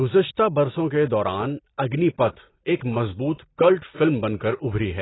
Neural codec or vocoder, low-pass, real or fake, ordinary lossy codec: none; 7.2 kHz; real; AAC, 16 kbps